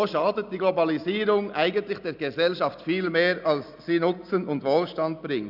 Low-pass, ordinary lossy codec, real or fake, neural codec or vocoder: 5.4 kHz; none; real; none